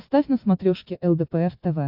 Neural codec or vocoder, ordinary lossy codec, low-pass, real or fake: none; AAC, 48 kbps; 5.4 kHz; real